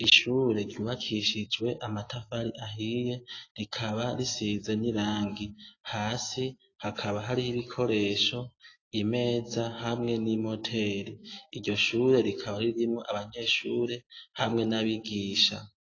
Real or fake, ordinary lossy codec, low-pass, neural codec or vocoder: real; AAC, 32 kbps; 7.2 kHz; none